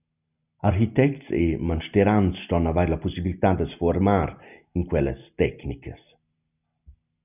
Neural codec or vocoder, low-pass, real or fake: none; 3.6 kHz; real